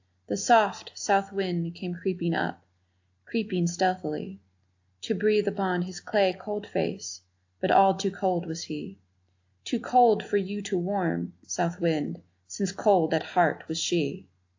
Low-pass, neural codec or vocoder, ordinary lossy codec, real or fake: 7.2 kHz; none; AAC, 48 kbps; real